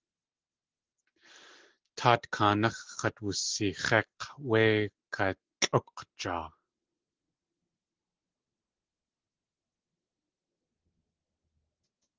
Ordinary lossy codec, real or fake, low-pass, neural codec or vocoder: Opus, 16 kbps; real; 7.2 kHz; none